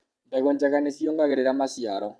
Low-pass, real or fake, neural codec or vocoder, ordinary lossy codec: none; fake; vocoder, 22.05 kHz, 80 mel bands, WaveNeXt; none